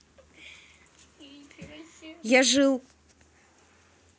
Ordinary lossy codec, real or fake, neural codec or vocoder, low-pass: none; real; none; none